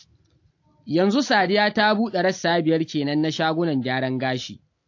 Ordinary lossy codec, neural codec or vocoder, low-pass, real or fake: AAC, 48 kbps; none; 7.2 kHz; real